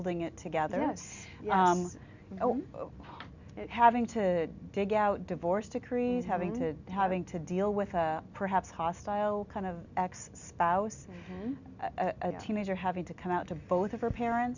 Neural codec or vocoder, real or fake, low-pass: none; real; 7.2 kHz